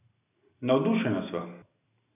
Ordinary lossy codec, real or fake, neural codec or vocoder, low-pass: none; real; none; 3.6 kHz